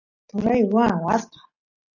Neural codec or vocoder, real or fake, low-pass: none; real; 7.2 kHz